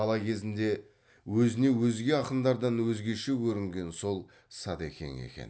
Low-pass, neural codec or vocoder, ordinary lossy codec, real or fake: none; none; none; real